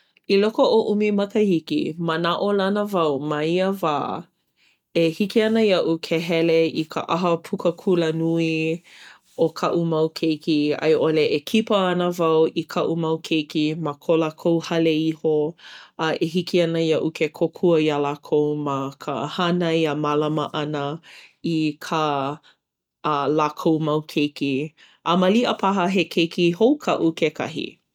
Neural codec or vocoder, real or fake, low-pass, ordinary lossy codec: none; real; 19.8 kHz; none